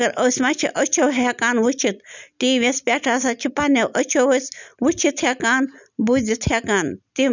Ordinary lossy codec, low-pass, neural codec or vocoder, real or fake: none; 7.2 kHz; none; real